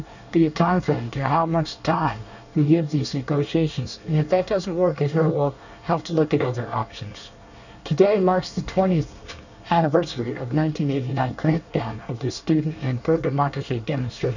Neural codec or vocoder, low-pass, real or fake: codec, 24 kHz, 1 kbps, SNAC; 7.2 kHz; fake